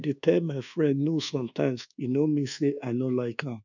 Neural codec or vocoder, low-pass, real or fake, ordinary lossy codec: codec, 24 kHz, 1.2 kbps, DualCodec; 7.2 kHz; fake; none